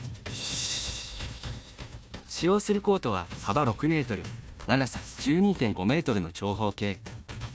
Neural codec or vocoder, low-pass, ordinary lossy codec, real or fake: codec, 16 kHz, 1 kbps, FunCodec, trained on Chinese and English, 50 frames a second; none; none; fake